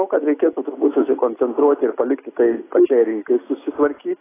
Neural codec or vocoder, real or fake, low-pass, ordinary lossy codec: none; real; 3.6 kHz; AAC, 16 kbps